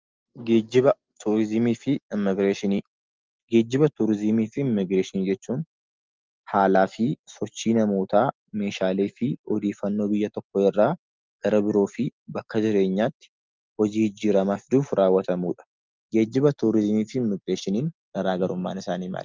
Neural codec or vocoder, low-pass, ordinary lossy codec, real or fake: none; 7.2 kHz; Opus, 32 kbps; real